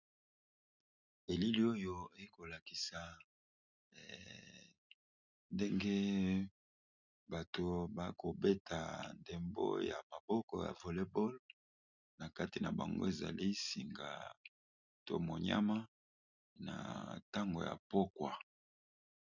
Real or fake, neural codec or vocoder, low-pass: real; none; 7.2 kHz